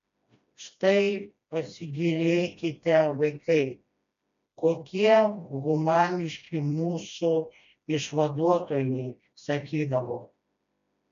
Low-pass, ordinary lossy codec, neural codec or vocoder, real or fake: 7.2 kHz; MP3, 64 kbps; codec, 16 kHz, 1 kbps, FreqCodec, smaller model; fake